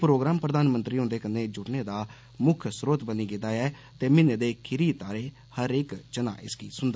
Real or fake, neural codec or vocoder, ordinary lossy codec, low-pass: real; none; none; none